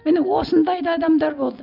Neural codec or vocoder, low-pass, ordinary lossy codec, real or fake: none; 5.4 kHz; none; real